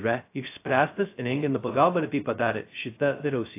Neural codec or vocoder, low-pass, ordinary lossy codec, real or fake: codec, 16 kHz, 0.2 kbps, FocalCodec; 3.6 kHz; AAC, 24 kbps; fake